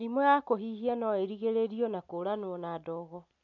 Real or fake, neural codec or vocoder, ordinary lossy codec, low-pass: real; none; none; 7.2 kHz